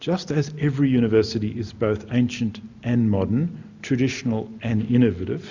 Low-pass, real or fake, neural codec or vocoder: 7.2 kHz; real; none